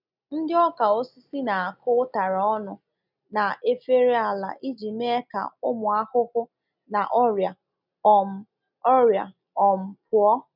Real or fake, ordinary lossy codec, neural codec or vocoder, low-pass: real; none; none; 5.4 kHz